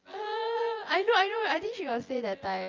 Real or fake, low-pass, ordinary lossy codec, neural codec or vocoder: fake; 7.2 kHz; Opus, 32 kbps; vocoder, 24 kHz, 100 mel bands, Vocos